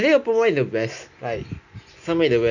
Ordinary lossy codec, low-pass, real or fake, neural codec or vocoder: none; 7.2 kHz; real; none